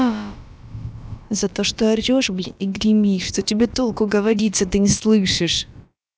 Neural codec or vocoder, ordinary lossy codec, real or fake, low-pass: codec, 16 kHz, about 1 kbps, DyCAST, with the encoder's durations; none; fake; none